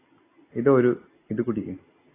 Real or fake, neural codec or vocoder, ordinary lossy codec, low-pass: real; none; AAC, 16 kbps; 3.6 kHz